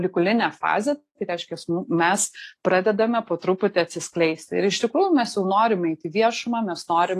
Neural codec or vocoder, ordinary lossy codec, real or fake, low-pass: none; AAC, 48 kbps; real; 14.4 kHz